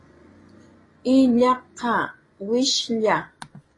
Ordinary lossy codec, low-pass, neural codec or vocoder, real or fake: AAC, 32 kbps; 10.8 kHz; vocoder, 24 kHz, 100 mel bands, Vocos; fake